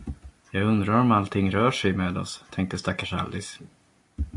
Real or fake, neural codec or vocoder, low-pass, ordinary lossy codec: real; none; 10.8 kHz; AAC, 64 kbps